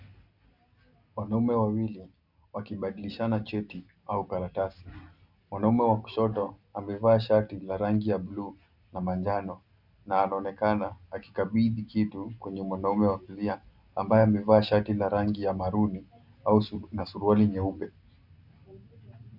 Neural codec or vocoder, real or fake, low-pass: none; real; 5.4 kHz